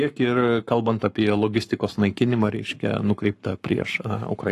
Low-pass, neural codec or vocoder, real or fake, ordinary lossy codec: 14.4 kHz; codec, 44.1 kHz, 7.8 kbps, Pupu-Codec; fake; AAC, 48 kbps